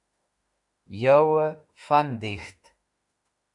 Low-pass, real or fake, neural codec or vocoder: 10.8 kHz; fake; autoencoder, 48 kHz, 32 numbers a frame, DAC-VAE, trained on Japanese speech